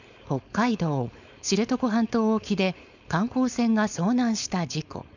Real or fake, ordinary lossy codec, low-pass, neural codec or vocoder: fake; none; 7.2 kHz; codec, 16 kHz, 4.8 kbps, FACodec